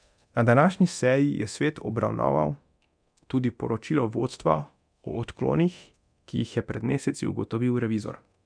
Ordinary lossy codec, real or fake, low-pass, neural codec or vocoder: none; fake; 9.9 kHz; codec, 24 kHz, 0.9 kbps, DualCodec